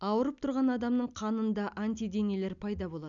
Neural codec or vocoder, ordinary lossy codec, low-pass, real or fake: none; none; 7.2 kHz; real